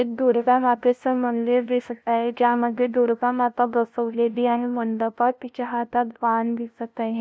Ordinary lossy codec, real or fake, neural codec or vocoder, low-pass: none; fake; codec, 16 kHz, 0.5 kbps, FunCodec, trained on LibriTTS, 25 frames a second; none